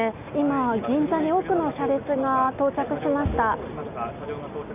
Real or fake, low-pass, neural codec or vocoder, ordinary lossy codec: real; 3.6 kHz; none; none